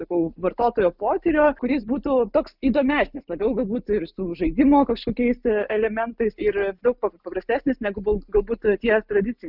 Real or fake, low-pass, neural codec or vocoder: real; 5.4 kHz; none